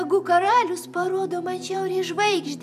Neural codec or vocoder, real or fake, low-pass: vocoder, 44.1 kHz, 128 mel bands every 512 samples, BigVGAN v2; fake; 14.4 kHz